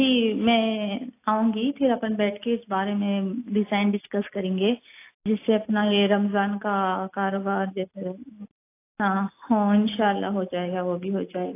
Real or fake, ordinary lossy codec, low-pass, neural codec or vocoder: real; AAC, 24 kbps; 3.6 kHz; none